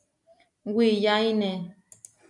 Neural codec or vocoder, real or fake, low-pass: none; real; 10.8 kHz